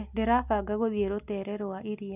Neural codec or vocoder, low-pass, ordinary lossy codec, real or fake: none; 3.6 kHz; none; real